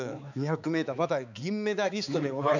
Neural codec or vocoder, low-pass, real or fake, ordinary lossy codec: codec, 16 kHz, 4 kbps, X-Codec, HuBERT features, trained on balanced general audio; 7.2 kHz; fake; none